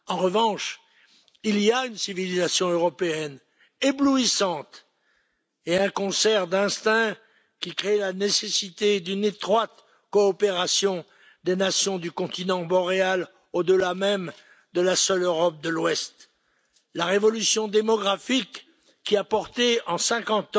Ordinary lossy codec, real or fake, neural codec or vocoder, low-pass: none; real; none; none